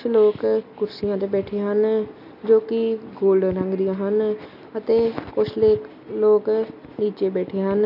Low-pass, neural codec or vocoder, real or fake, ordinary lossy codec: 5.4 kHz; none; real; none